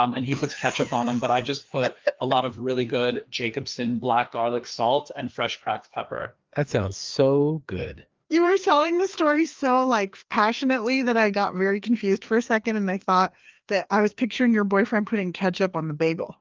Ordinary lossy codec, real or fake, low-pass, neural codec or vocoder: Opus, 32 kbps; fake; 7.2 kHz; codec, 16 kHz, 2 kbps, FreqCodec, larger model